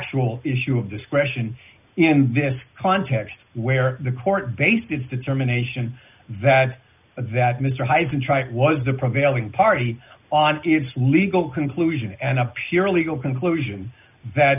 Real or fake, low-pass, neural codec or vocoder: real; 3.6 kHz; none